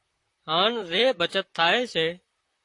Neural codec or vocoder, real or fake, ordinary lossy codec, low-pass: vocoder, 44.1 kHz, 128 mel bands, Pupu-Vocoder; fake; AAC, 48 kbps; 10.8 kHz